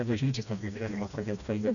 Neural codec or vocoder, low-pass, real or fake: codec, 16 kHz, 1 kbps, FreqCodec, smaller model; 7.2 kHz; fake